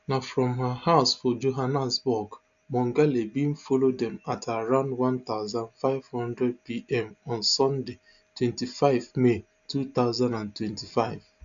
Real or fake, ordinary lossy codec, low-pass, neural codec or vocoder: real; none; 7.2 kHz; none